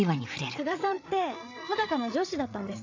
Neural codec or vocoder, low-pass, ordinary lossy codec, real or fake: codec, 16 kHz, 8 kbps, FreqCodec, larger model; 7.2 kHz; AAC, 48 kbps; fake